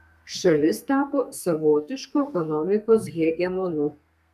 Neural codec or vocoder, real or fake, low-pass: codec, 32 kHz, 1.9 kbps, SNAC; fake; 14.4 kHz